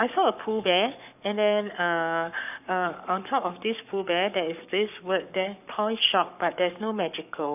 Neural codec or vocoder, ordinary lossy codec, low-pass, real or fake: codec, 44.1 kHz, 7.8 kbps, Pupu-Codec; none; 3.6 kHz; fake